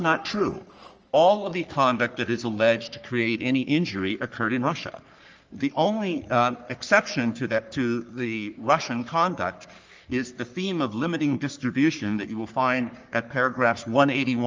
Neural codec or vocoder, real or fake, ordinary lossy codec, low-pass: codec, 44.1 kHz, 3.4 kbps, Pupu-Codec; fake; Opus, 24 kbps; 7.2 kHz